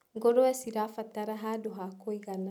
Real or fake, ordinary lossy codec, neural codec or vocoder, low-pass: real; none; none; 19.8 kHz